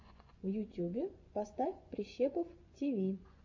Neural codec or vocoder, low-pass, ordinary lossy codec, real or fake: vocoder, 24 kHz, 100 mel bands, Vocos; 7.2 kHz; AAC, 48 kbps; fake